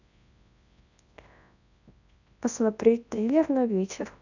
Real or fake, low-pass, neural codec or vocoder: fake; 7.2 kHz; codec, 24 kHz, 0.9 kbps, WavTokenizer, large speech release